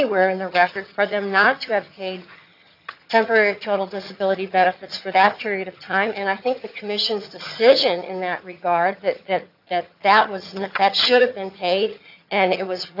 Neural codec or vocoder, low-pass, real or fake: vocoder, 22.05 kHz, 80 mel bands, HiFi-GAN; 5.4 kHz; fake